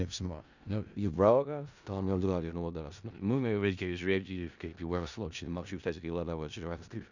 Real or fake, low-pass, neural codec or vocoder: fake; 7.2 kHz; codec, 16 kHz in and 24 kHz out, 0.4 kbps, LongCat-Audio-Codec, four codebook decoder